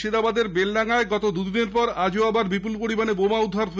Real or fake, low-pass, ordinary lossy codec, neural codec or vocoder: real; none; none; none